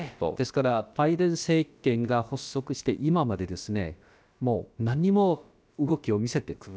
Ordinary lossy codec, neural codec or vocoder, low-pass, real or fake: none; codec, 16 kHz, about 1 kbps, DyCAST, with the encoder's durations; none; fake